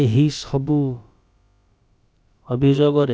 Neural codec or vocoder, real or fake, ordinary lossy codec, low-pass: codec, 16 kHz, about 1 kbps, DyCAST, with the encoder's durations; fake; none; none